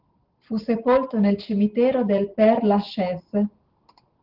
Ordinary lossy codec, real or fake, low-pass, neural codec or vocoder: Opus, 16 kbps; real; 5.4 kHz; none